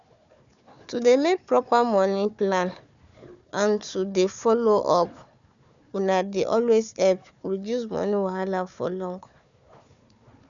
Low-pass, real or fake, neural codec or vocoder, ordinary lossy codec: 7.2 kHz; fake; codec, 16 kHz, 4 kbps, FunCodec, trained on Chinese and English, 50 frames a second; none